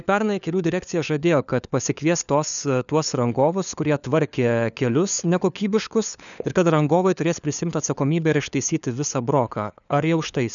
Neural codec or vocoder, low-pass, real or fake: codec, 16 kHz, 4 kbps, FunCodec, trained on LibriTTS, 50 frames a second; 7.2 kHz; fake